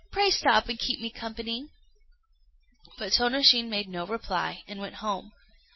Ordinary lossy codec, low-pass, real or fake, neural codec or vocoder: MP3, 24 kbps; 7.2 kHz; real; none